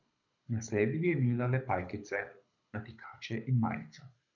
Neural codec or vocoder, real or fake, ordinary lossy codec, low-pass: codec, 24 kHz, 6 kbps, HILCodec; fake; none; 7.2 kHz